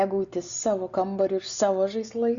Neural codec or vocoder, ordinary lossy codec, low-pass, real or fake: none; Opus, 64 kbps; 7.2 kHz; real